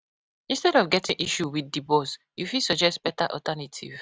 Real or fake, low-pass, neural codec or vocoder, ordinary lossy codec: real; none; none; none